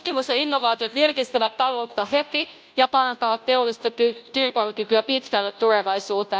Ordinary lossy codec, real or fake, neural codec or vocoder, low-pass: none; fake; codec, 16 kHz, 0.5 kbps, FunCodec, trained on Chinese and English, 25 frames a second; none